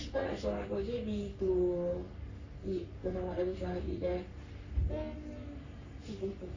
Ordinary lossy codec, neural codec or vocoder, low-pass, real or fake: AAC, 48 kbps; codec, 44.1 kHz, 3.4 kbps, Pupu-Codec; 7.2 kHz; fake